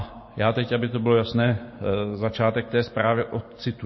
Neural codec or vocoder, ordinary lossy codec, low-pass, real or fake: none; MP3, 24 kbps; 7.2 kHz; real